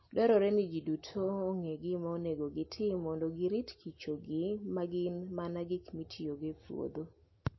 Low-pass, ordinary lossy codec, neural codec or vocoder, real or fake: 7.2 kHz; MP3, 24 kbps; none; real